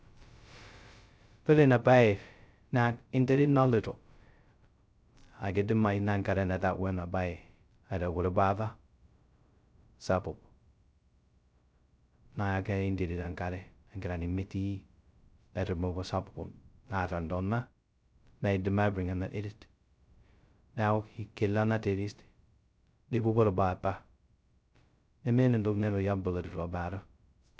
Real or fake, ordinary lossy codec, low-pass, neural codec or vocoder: fake; none; none; codec, 16 kHz, 0.2 kbps, FocalCodec